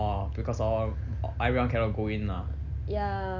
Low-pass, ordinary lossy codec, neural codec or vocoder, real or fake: 7.2 kHz; none; none; real